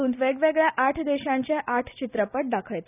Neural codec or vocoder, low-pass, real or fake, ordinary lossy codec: none; 3.6 kHz; real; none